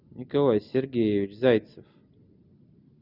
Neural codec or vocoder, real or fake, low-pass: none; real; 5.4 kHz